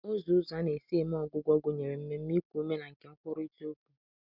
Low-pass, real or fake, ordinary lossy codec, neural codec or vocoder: 5.4 kHz; real; none; none